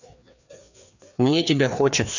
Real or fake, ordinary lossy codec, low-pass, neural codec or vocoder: fake; none; 7.2 kHz; codec, 16 kHz, 2 kbps, FreqCodec, larger model